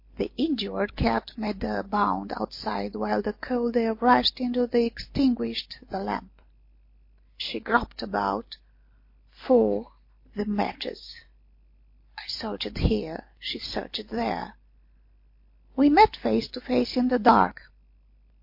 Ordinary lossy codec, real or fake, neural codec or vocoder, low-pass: MP3, 32 kbps; real; none; 5.4 kHz